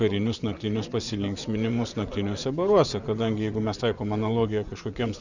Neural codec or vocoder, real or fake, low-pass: vocoder, 24 kHz, 100 mel bands, Vocos; fake; 7.2 kHz